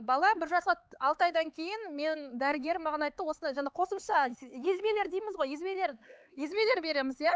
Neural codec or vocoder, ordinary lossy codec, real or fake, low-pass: codec, 16 kHz, 4 kbps, X-Codec, HuBERT features, trained on LibriSpeech; none; fake; none